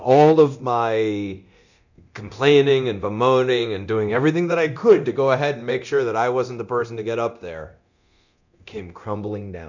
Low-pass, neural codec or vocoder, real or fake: 7.2 kHz; codec, 24 kHz, 0.9 kbps, DualCodec; fake